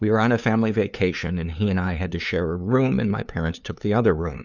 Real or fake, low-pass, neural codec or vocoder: fake; 7.2 kHz; codec, 16 kHz, 2 kbps, FunCodec, trained on LibriTTS, 25 frames a second